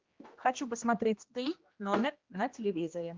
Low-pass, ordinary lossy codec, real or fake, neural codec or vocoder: 7.2 kHz; Opus, 32 kbps; fake; codec, 16 kHz, 1 kbps, X-Codec, HuBERT features, trained on general audio